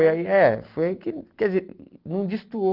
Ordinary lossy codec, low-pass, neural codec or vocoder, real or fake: Opus, 32 kbps; 5.4 kHz; none; real